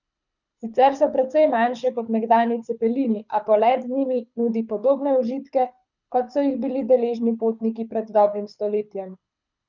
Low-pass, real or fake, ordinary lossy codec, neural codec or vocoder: 7.2 kHz; fake; none; codec, 24 kHz, 6 kbps, HILCodec